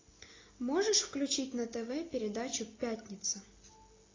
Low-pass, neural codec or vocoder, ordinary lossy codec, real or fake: 7.2 kHz; none; AAC, 32 kbps; real